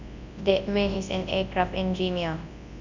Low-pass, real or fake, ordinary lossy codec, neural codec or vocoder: 7.2 kHz; fake; none; codec, 24 kHz, 0.9 kbps, WavTokenizer, large speech release